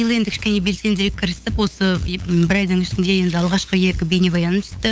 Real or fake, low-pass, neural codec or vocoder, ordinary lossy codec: fake; none; codec, 16 kHz, 8 kbps, FunCodec, trained on LibriTTS, 25 frames a second; none